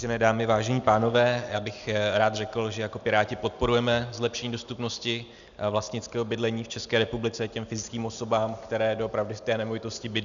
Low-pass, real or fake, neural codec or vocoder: 7.2 kHz; real; none